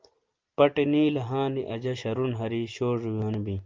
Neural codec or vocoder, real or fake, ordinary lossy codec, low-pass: none; real; Opus, 32 kbps; 7.2 kHz